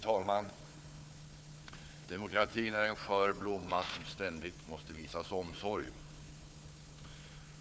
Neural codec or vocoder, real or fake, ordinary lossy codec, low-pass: codec, 16 kHz, 4 kbps, FunCodec, trained on Chinese and English, 50 frames a second; fake; none; none